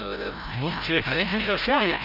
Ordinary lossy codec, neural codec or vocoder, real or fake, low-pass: none; codec, 16 kHz, 0.5 kbps, FreqCodec, larger model; fake; 5.4 kHz